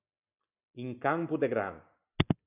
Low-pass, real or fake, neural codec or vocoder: 3.6 kHz; real; none